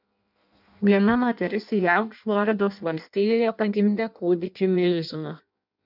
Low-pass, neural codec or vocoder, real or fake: 5.4 kHz; codec, 16 kHz in and 24 kHz out, 0.6 kbps, FireRedTTS-2 codec; fake